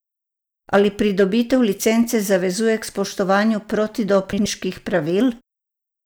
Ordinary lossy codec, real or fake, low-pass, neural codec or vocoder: none; real; none; none